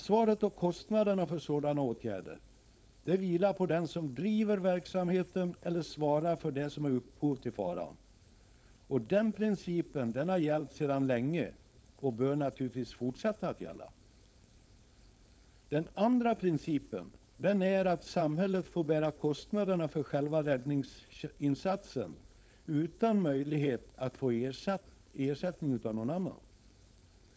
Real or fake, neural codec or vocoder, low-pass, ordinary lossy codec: fake; codec, 16 kHz, 4.8 kbps, FACodec; none; none